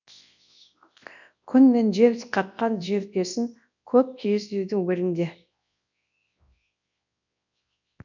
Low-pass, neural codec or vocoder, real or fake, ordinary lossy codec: 7.2 kHz; codec, 24 kHz, 0.9 kbps, WavTokenizer, large speech release; fake; none